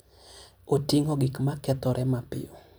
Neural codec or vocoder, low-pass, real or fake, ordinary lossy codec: vocoder, 44.1 kHz, 128 mel bands every 256 samples, BigVGAN v2; none; fake; none